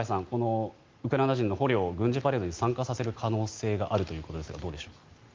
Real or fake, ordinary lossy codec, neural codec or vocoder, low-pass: real; Opus, 32 kbps; none; 7.2 kHz